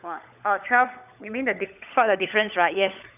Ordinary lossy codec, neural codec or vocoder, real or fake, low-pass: none; codec, 16 kHz, 16 kbps, FunCodec, trained on LibriTTS, 50 frames a second; fake; 3.6 kHz